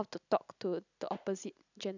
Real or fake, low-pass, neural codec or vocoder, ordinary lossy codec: real; 7.2 kHz; none; none